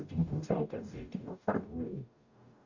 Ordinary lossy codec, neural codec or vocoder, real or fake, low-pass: Opus, 64 kbps; codec, 44.1 kHz, 0.9 kbps, DAC; fake; 7.2 kHz